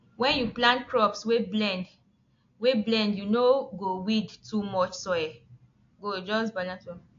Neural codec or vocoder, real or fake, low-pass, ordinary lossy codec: none; real; 7.2 kHz; AAC, 48 kbps